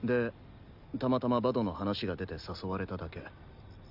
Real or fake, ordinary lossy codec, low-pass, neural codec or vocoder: real; none; 5.4 kHz; none